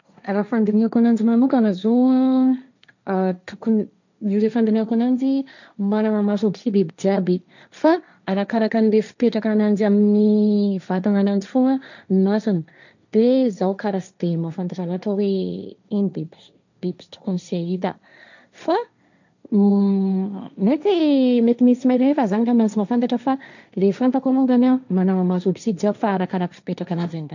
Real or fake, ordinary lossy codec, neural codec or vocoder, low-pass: fake; none; codec, 16 kHz, 1.1 kbps, Voila-Tokenizer; 7.2 kHz